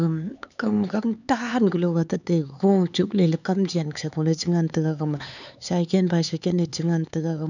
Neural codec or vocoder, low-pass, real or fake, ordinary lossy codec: codec, 16 kHz, 4 kbps, X-Codec, HuBERT features, trained on LibriSpeech; 7.2 kHz; fake; none